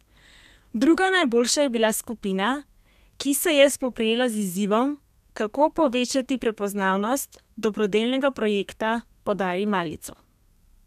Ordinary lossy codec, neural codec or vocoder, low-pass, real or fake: none; codec, 32 kHz, 1.9 kbps, SNAC; 14.4 kHz; fake